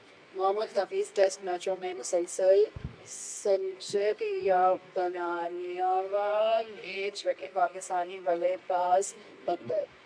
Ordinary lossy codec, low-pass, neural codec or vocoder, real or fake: AAC, 64 kbps; 9.9 kHz; codec, 24 kHz, 0.9 kbps, WavTokenizer, medium music audio release; fake